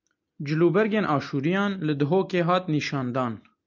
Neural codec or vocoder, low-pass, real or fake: none; 7.2 kHz; real